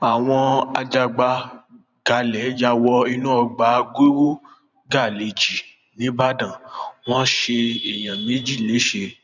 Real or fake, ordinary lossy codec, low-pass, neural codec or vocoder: fake; none; 7.2 kHz; vocoder, 44.1 kHz, 128 mel bands every 512 samples, BigVGAN v2